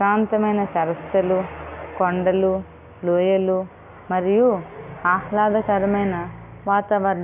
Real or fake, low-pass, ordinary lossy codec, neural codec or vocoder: real; 3.6 kHz; none; none